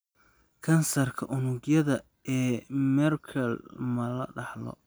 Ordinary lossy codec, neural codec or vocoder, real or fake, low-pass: none; none; real; none